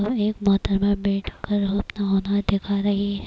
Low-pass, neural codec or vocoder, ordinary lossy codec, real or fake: none; none; none; real